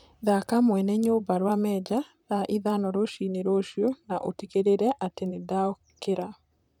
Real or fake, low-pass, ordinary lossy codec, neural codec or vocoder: fake; 19.8 kHz; none; vocoder, 44.1 kHz, 128 mel bands, Pupu-Vocoder